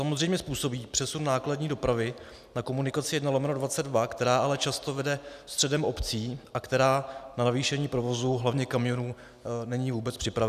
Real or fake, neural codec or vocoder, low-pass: real; none; 14.4 kHz